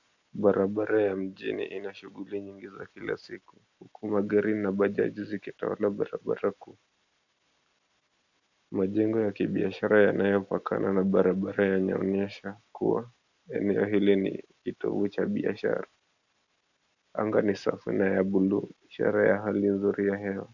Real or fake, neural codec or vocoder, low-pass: real; none; 7.2 kHz